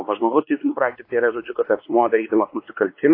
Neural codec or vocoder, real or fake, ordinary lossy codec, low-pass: codec, 16 kHz, 2 kbps, X-Codec, WavLM features, trained on Multilingual LibriSpeech; fake; AAC, 32 kbps; 5.4 kHz